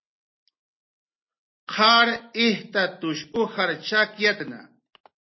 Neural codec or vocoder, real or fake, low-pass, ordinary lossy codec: none; real; 7.2 kHz; MP3, 24 kbps